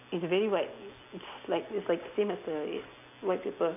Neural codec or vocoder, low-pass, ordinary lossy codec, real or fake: none; 3.6 kHz; none; real